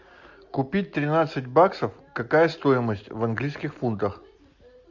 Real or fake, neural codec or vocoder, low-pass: real; none; 7.2 kHz